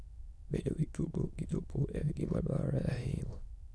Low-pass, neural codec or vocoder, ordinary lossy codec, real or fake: none; autoencoder, 22.05 kHz, a latent of 192 numbers a frame, VITS, trained on many speakers; none; fake